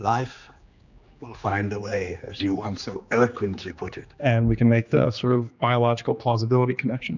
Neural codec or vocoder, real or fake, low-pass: codec, 16 kHz, 2 kbps, X-Codec, HuBERT features, trained on general audio; fake; 7.2 kHz